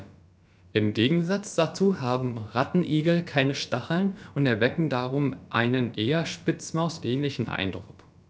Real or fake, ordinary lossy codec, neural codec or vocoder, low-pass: fake; none; codec, 16 kHz, about 1 kbps, DyCAST, with the encoder's durations; none